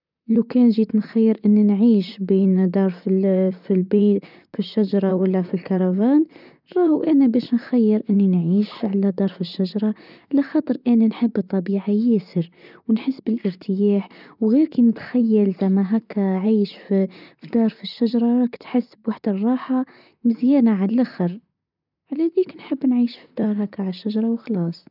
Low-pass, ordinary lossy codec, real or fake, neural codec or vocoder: 5.4 kHz; none; fake; vocoder, 44.1 kHz, 128 mel bands, Pupu-Vocoder